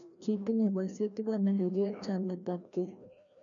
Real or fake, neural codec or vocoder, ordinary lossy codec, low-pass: fake; codec, 16 kHz, 1 kbps, FreqCodec, larger model; none; 7.2 kHz